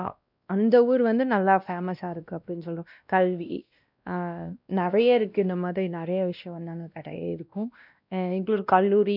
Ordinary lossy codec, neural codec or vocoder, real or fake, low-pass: none; codec, 16 kHz, 1 kbps, X-Codec, WavLM features, trained on Multilingual LibriSpeech; fake; 5.4 kHz